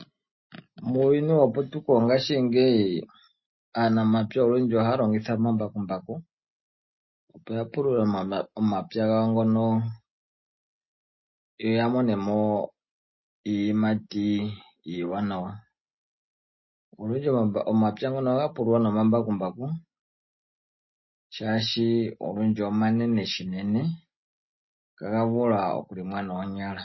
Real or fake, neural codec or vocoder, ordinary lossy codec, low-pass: real; none; MP3, 24 kbps; 7.2 kHz